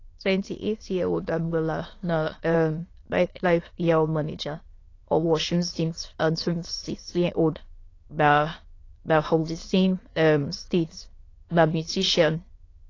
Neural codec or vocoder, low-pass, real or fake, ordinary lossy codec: autoencoder, 22.05 kHz, a latent of 192 numbers a frame, VITS, trained on many speakers; 7.2 kHz; fake; AAC, 32 kbps